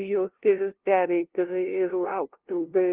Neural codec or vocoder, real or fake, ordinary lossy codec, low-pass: codec, 16 kHz, 0.5 kbps, FunCodec, trained on LibriTTS, 25 frames a second; fake; Opus, 16 kbps; 3.6 kHz